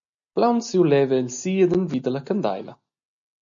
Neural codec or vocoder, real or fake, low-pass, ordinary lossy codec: none; real; 7.2 kHz; MP3, 96 kbps